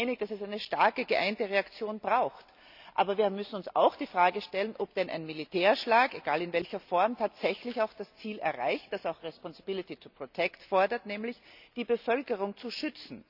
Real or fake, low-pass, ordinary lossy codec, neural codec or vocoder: real; 5.4 kHz; none; none